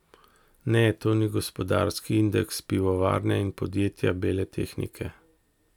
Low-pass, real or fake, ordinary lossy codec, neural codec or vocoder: 19.8 kHz; real; none; none